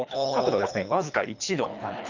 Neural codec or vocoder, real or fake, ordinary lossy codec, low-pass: codec, 24 kHz, 3 kbps, HILCodec; fake; none; 7.2 kHz